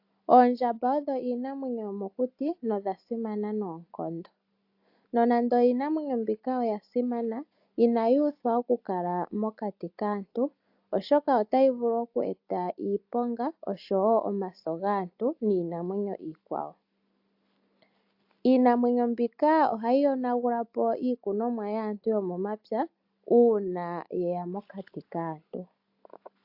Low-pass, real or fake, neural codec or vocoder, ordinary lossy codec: 5.4 kHz; real; none; AAC, 48 kbps